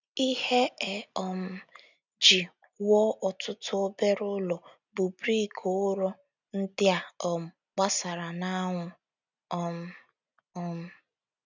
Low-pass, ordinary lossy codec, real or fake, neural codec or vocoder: 7.2 kHz; none; real; none